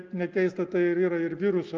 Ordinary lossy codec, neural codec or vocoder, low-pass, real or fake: Opus, 24 kbps; none; 7.2 kHz; real